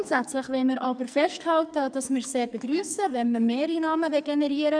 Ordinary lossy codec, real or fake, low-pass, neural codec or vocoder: none; fake; 9.9 kHz; codec, 44.1 kHz, 2.6 kbps, SNAC